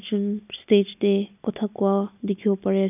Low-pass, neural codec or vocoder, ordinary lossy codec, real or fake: 3.6 kHz; none; none; real